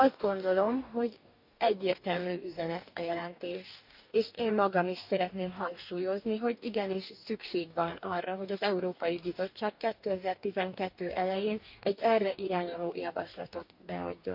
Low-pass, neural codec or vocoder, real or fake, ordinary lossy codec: 5.4 kHz; codec, 44.1 kHz, 2.6 kbps, DAC; fake; none